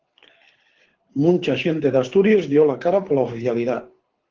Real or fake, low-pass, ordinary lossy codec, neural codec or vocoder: fake; 7.2 kHz; Opus, 16 kbps; codec, 24 kHz, 6 kbps, HILCodec